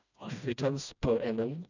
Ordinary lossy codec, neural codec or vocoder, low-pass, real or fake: none; codec, 16 kHz, 1 kbps, FreqCodec, smaller model; 7.2 kHz; fake